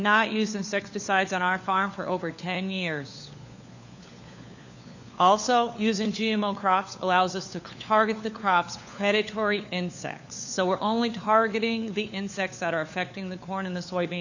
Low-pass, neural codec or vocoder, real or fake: 7.2 kHz; codec, 16 kHz, 4 kbps, FunCodec, trained on LibriTTS, 50 frames a second; fake